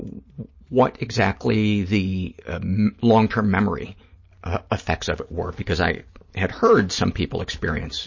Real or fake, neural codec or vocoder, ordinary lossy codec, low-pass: real; none; MP3, 32 kbps; 7.2 kHz